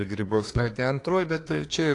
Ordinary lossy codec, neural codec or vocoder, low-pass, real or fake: AAC, 48 kbps; codec, 24 kHz, 1 kbps, SNAC; 10.8 kHz; fake